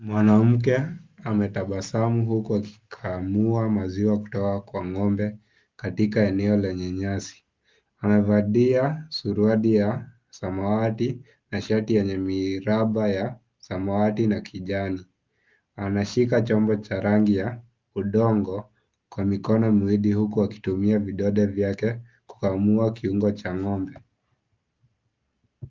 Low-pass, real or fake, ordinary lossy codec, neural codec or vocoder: 7.2 kHz; real; Opus, 32 kbps; none